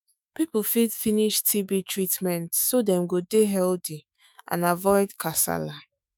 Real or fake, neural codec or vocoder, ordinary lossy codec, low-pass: fake; autoencoder, 48 kHz, 128 numbers a frame, DAC-VAE, trained on Japanese speech; none; none